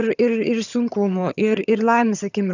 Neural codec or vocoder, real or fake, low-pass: vocoder, 22.05 kHz, 80 mel bands, HiFi-GAN; fake; 7.2 kHz